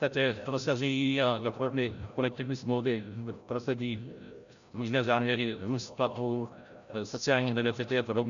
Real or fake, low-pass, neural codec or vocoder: fake; 7.2 kHz; codec, 16 kHz, 0.5 kbps, FreqCodec, larger model